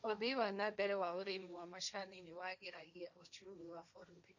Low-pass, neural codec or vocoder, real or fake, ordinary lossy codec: 7.2 kHz; codec, 16 kHz, 1.1 kbps, Voila-Tokenizer; fake; none